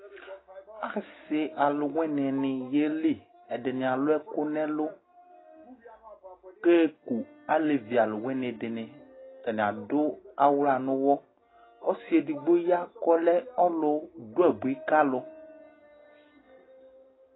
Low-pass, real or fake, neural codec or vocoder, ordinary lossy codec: 7.2 kHz; real; none; AAC, 16 kbps